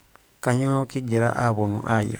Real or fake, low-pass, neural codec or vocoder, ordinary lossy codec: fake; none; codec, 44.1 kHz, 2.6 kbps, SNAC; none